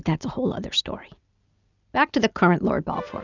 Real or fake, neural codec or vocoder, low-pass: real; none; 7.2 kHz